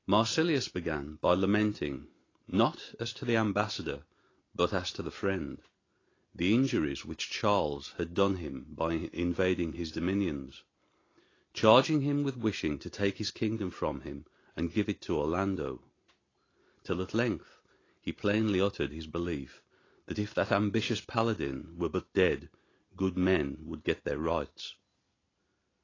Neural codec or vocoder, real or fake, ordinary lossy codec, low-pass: none; real; AAC, 32 kbps; 7.2 kHz